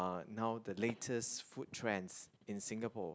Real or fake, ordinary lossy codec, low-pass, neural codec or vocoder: real; none; none; none